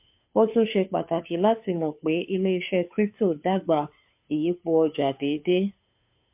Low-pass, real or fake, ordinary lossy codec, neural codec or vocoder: 3.6 kHz; fake; MP3, 32 kbps; codec, 16 kHz, 2 kbps, FunCodec, trained on Chinese and English, 25 frames a second